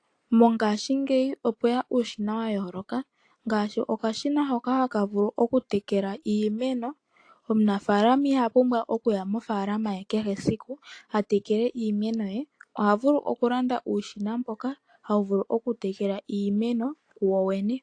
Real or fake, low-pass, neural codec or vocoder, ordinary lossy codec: real; 9.9 kHz; none; AAC, 48 kbps